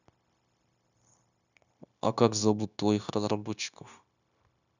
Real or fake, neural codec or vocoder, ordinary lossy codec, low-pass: fake; codec, 16 kHz, 0.9 kbps, LongCat-Audio-Codec; none; 7.2 kHz